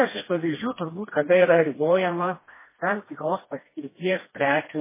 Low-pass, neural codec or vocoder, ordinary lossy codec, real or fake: 3.6 kHz; codec, 16 kHz, 1 kbps, FreqCodec, smaller model; MP3, 16 kbps; fake